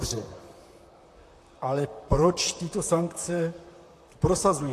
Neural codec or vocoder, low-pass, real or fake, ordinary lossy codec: vocoder, 44.1 kHz, 128 mel bands, Pupu-Vocoder; 14.4 kHz; fake; AAC, 64 kbps